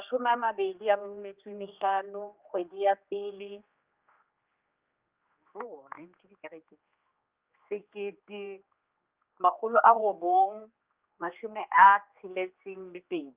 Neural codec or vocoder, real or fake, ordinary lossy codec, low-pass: codec, 16 kHz, 2 kbps, X-Codec, HuBERT features, trained on general audio; fake; Opus, 24 kbps; 3.6 kHz